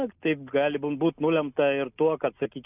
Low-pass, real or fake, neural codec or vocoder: 3.6 kHz; real; none